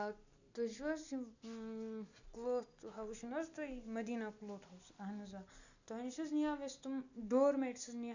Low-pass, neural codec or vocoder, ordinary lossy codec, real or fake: 7.2 kHz; none; none; real